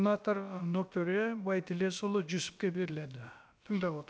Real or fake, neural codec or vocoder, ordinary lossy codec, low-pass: fake; codec, 16 kHz, about 1 kbps, DyCAST, with the encoder's durations; none; none